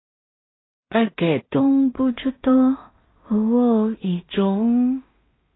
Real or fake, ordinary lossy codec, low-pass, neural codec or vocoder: fake; AAC, 16 kbps; 7.2 kHz; codec, 16 kHz in and 24 kHz out, 0.4 kbps, LongCat-Audio-Codec, two codebook decoder